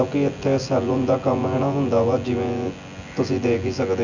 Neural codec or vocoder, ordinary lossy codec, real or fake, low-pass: vocoder, 24 kHz, 100 mel bands, Vocos; none; fake; 7.2 kHz